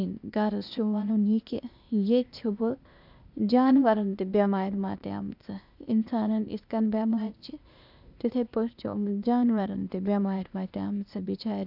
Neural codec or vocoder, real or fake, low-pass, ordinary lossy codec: codec, 16 kHz, 0.8 kbps, ZipCodec; fake; 5.4 kHz; none